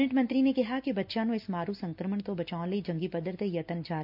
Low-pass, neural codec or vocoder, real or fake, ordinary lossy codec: 5.4 kHz; none; real; AAC, 48 kbps